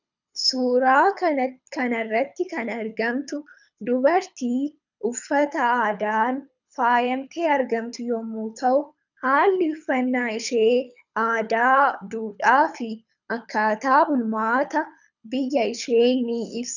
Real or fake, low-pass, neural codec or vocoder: fake; 7.2 kHz; codec, 24 kHz, 6 kbps, HILCodec